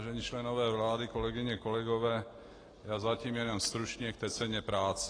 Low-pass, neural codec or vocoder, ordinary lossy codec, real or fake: 9.9 kHz; none; AAC, 32 kbps; real